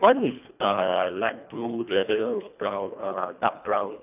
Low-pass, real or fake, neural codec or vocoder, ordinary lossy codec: 3.6 kHz; fake; codec, 24 kHz, 1.5 kbps, HILCodec; none